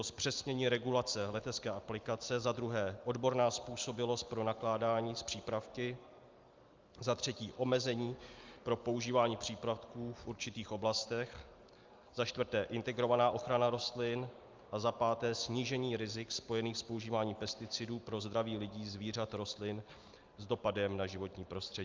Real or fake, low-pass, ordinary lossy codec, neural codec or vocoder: real; 7.2 kHz; Opus, 24 kbps; none